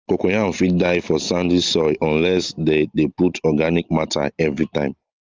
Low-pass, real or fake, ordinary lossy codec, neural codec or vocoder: 7.2 kHz; real; Opus, 32 kbps; none